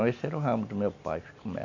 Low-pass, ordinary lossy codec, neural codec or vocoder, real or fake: 7.2 kHz; none; none; real